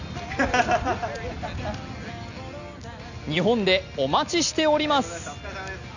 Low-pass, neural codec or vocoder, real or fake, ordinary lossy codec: 7.2 kHz; none; real; none